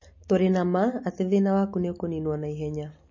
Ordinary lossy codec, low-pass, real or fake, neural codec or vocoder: MP3, 32 kbps; 7.2 kHz; real; none